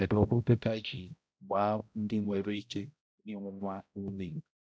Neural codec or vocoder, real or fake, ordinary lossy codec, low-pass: codec, 16 kHz, 0.5 kbps, X-Codec, HuBERT features, trained on balanced general audio; fake; none; none